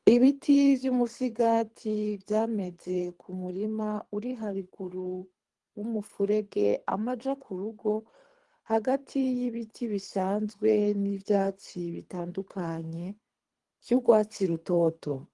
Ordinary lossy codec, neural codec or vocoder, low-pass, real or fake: Opus, 24 kbps; codec, 24 kHz, 3 kbps, HILCodec; 10.8 kHz; fake